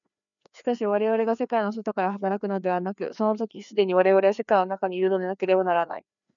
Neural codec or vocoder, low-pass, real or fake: codec, 16 kHz, 2 kbps, FreqCodec, larger model; 7.2 kHz; fake